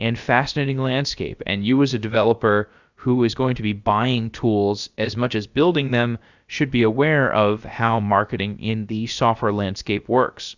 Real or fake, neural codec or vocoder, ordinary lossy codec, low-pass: fake; codec, 16 kHz, about 1 kbps, DyCAST, with the encoder's durations; Opus, 64 kbps; 7.2 kHz